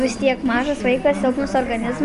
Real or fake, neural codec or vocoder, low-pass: real; none; 10.8 kHz